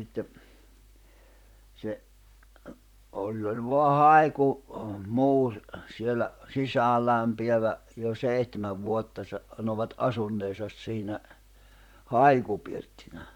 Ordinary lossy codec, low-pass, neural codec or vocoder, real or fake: none; 19.8 kHz; vocoder, 44.1 kHz, 128 mel bands, Pupu-Vocoder; fake